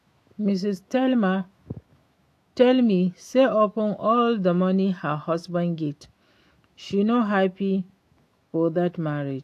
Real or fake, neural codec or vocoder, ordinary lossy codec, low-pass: fake; autoencoder, 48 kHz, 128 numbers a frame, DAC-VAE, trained on Japanese speech; AAC, 64 kbps; 14.4 kHz